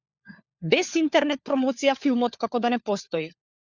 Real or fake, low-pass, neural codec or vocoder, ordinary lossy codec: fake; 7.2 kHz; codec, 16 kHz, 4 kbps, FunCodec, trained on LibriTTS, 50 frames a second; Opus, 64 kbps